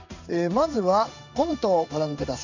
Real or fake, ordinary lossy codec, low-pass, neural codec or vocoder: fake; none; 7.2 kHz; codec, 16 kHz in and 24 kHz out, 1 kbps, XY-Tokenizer